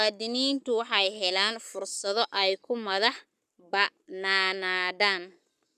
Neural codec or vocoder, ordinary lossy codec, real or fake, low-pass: codec, 44.1 kHz, 7.8 kbps, Pupu-Codec; none; fake; 14.4 kHz